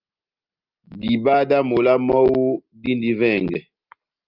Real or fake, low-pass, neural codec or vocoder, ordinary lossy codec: real; 5.4 kHz; none; Opus, 32 kbps